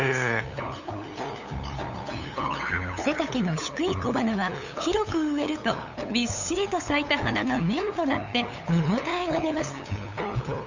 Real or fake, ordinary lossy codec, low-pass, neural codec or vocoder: fake; Opus, 64 kbps; 7.2 kHz; codec, 16 kHz, 8 kbps, FunCodec, trained on LibriTTS, 25 frames a second